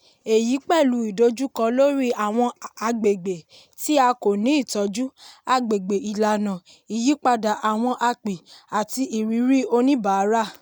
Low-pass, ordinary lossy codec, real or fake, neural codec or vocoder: none; none; real; none